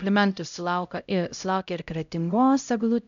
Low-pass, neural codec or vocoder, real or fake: 7.2 kHz; codec, 16 kHz, 0.5 kbps, X-Codec, HuBERT features, trained on LibriSpeech; fake